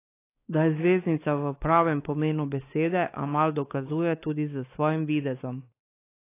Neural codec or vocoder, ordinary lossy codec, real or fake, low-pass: codec, 16 kHz, 4 kbps, X-Codec, WavLM features, trained on Multilingual LibriSpeech; AAC, 24 kbps; fake; 3.6 kHz